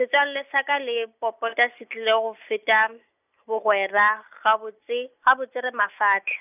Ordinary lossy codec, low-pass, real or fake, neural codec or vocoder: none; 3.6 kHz; real; none